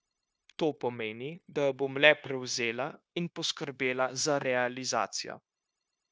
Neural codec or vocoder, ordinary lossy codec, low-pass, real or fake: codec, 16 kHz, 0.9 kbps, LongCat-Audio-Codec; none; none; fake